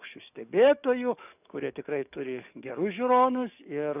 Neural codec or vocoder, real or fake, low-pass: codec, 44.1 kHz, 7.8 kbps, DAC; fake; 3.6 kHz